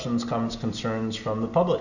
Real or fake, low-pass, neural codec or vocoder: real; 7.2 kHz; none